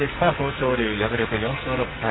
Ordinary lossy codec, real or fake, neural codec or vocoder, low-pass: AAC, 16 kbps; fake; codec, 44.1 kHz, 2.6 kbps, SNAC; 7.2 kHz